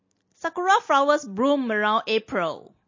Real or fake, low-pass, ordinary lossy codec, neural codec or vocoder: real; 7.2 kHz; MP3, 32 kbps; none